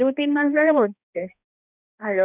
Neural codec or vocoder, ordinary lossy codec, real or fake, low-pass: codec, 16 kHz, 1 kbps, X-Codec, HuBERT features, trained on balanced general audio; none; fake; 3.6 kHz